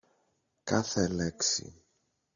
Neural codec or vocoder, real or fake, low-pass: none; real; 7.2 kHz